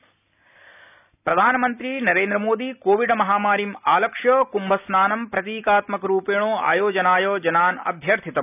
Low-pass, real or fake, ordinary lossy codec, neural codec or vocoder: 3.6 kHz; real; none; none